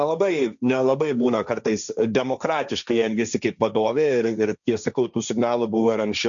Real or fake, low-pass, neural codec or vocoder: fake; 7.2 kHz; codec, 16 kHz, 1.1 kbps, Voila-Tokenizer